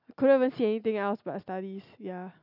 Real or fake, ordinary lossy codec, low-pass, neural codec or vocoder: real; none; 5.4 kHz; none